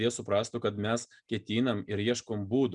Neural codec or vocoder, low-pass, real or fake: none; 9.9 kHz; real